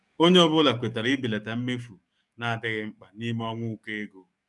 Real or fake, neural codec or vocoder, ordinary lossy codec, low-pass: fake; codec, 44.1 kHz, 7.8 kbps, DAC; none; 10.8 kHz